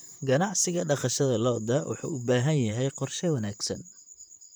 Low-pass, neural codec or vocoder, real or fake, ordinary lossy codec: none; vocoder, 44.1 kHz, 128 mel bands, Pupu-Vocoder; fake; none